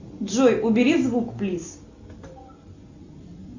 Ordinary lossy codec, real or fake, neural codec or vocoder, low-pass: Opus, 64 kbps; real; none; 7.2 kHz